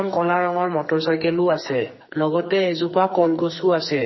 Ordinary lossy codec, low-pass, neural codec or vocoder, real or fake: MP3, 24 kbps; 7.2 kHz; codec, 44.1 kHz, 2.6 kbps, SNAC; fake